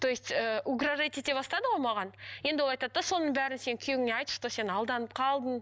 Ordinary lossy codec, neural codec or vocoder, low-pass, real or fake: none; none; none; real